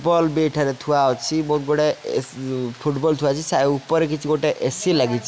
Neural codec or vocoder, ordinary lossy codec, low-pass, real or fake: none; none; none; real